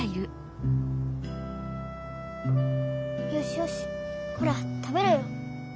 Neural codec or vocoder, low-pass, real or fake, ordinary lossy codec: none; none; real; none